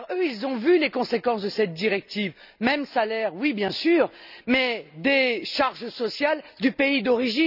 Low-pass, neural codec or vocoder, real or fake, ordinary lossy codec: 5.4 kHz; none; real; none